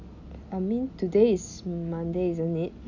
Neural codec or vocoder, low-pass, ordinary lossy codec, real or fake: none; 7.2 kHz; none; real